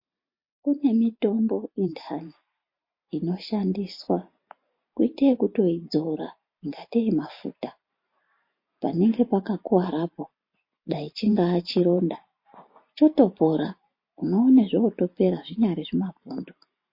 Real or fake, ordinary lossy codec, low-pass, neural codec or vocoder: fake; MP3, 32 kbps; 5.4 kHz; vocoder, 44.1 kHz, 128 mel bands every 512 samples, BigVGAN v2